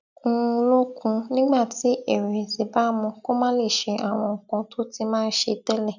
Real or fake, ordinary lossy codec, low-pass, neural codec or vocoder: real; none; 7.2 kHz; none